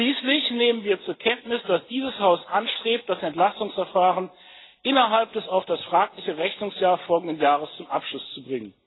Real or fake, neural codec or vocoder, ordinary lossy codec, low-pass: real; none; AAC, 16 kbps; 7.2 kHz